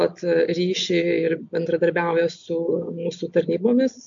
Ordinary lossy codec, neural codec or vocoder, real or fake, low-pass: MP3, 64 kbps; none; real; 7.2 kHz